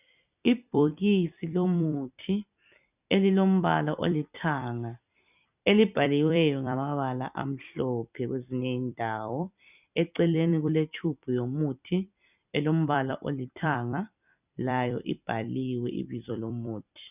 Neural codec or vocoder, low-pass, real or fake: vocoder, 44.1 kHz, 128 mel bands every 256 samples, BigVGAN v2; 3.6 kHz; fake